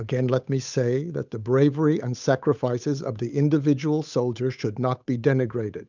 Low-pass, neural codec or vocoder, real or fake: 7.2 kHz; codec, 16 kHz, 8 kbps, FunCodec, trained on Chinese and English, 25 frames a second; fake